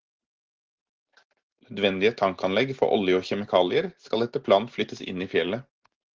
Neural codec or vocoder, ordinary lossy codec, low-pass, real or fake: none; Opus, 16 kbps; 7.2 kHz; real